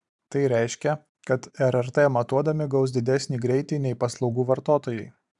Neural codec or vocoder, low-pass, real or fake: vocoder, 44.1 kHz, 128 mel bands every 512 samples, BigVGAN v2; 10.8 kHz; fake